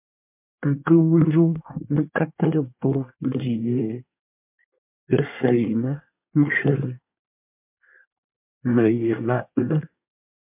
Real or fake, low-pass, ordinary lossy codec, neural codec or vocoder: fake; 3.6 kHz; MP3, 32 kbps; codec, 24 kHz, 1 kbps, SNAC